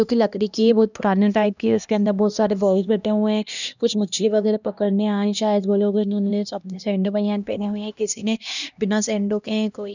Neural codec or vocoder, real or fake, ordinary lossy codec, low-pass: codec, 16 kHz, 1 kbps, X-Codec, HuBERT features, trained on LibriSpeech; fake; none; 7.2 kHz